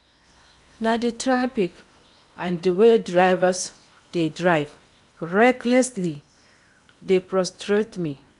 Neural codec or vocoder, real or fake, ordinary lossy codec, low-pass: codec, 16 kHz in and 24 kHz out, 0.8 kbps, FocalCodec, streaming, 65536 codes; fake; none; 10.8 kHz